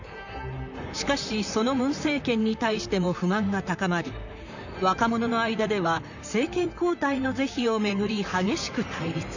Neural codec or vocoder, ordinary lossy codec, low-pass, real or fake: vocoder, 44.1 kHz, 128 mel bands, Pupu-Vocoder; none; 7.2 kHz; fake